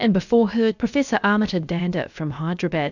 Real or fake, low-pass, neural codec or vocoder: fake; 7.2 kHz; codec, 16 kHz, about 1 kbps, DyCAST, with the encoder's durations